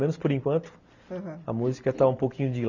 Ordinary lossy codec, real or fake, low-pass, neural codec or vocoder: none; real; 7.2 kHz; none